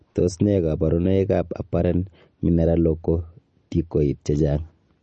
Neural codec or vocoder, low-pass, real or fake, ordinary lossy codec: none; 10.8 kHz; real; MP3, 32 kbps